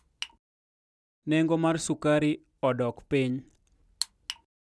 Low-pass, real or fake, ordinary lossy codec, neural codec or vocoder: none; real; none; none